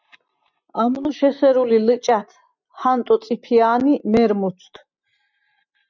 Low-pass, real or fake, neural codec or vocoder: 7.2 kHz; real; none